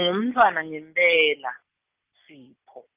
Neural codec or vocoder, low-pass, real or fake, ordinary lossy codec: none; 3.6 kHz; real; Opus, 24 kbps